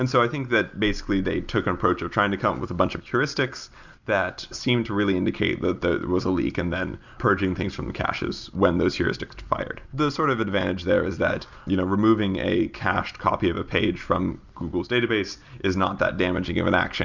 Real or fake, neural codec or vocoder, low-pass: real; none; 7.2 kHz